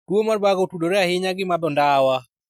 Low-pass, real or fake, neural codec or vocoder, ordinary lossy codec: 19.8 kHz; real; none; none